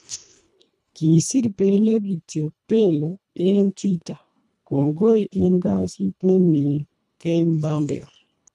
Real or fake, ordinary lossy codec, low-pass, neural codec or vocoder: fake; none; none; codec, 24 kHz, 1.5 kbps, HILCodec